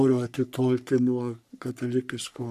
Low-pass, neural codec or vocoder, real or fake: 14.4 kHz; codec, 44.1 kHz, 3.4 kbps, Pupu-Codec; fake